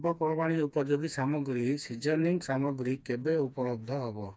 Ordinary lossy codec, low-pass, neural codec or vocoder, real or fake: none; none; codec, 16 kHz, 2 kbps, FreqCodec, smaller model; fake